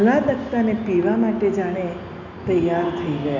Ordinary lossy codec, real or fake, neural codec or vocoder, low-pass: none; real; none; 7.2 kHz